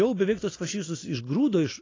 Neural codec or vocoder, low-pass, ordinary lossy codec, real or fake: none; 7.2 kHz; AAC, 32 kbps; real